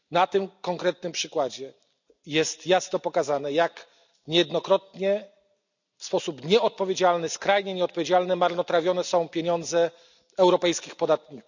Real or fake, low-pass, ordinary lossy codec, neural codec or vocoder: real; 7.2 kHz; none; none